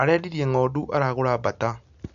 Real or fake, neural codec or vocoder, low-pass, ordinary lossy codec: real; none; 7.2 kHz; none